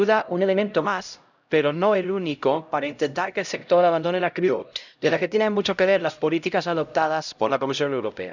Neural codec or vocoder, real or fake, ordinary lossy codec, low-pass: codec, 16 kHz, 0.5 kbps, X-Codec, HuBERT features, trained on LibriSpeech; fake; none; 7.2 kHz